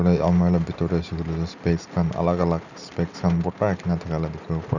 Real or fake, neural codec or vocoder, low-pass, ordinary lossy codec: real; none; 7.2 kHz; none